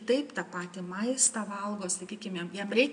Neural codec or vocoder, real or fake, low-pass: none; real; 9.9 kHz